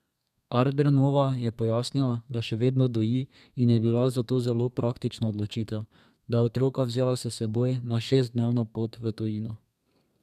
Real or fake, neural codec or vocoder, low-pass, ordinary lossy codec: fake; codec, 32 kHz, 1.9 kbps, SNAC; 14.4 kHz; none